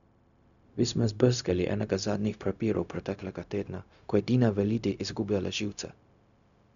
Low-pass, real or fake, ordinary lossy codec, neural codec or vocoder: 7.2 kHz; fake; none; codec, 16 kHz, 0.4 kbps, LongCat-Audio-Codec